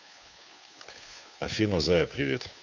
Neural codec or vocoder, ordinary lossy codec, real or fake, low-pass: codec, 16 kHz, 2 kbps, FunCodec, trained on Chinese and English, 25 frames a second; MP3, 64 kbps; fake; 7.2 kHz